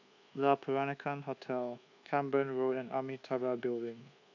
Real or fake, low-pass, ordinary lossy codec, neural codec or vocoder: fake; 7.2 kHz; AAC, 48 kbps; codec, 24 kHz, 1.2 kbps, DualCodec